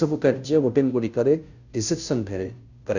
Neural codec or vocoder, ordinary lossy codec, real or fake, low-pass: codec, 16 kHz, 0.5 kbps, FunCodec, trained on Chinese and English, 25 frames a second; none; fake; 7.2 kHz